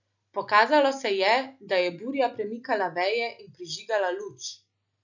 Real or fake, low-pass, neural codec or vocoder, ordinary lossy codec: real; 7.2 kHz; none; none